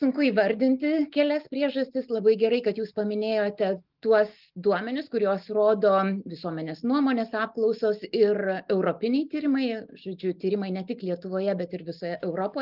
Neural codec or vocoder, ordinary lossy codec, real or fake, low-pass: none; Opus, 32 kbps; real; 5.4 kHz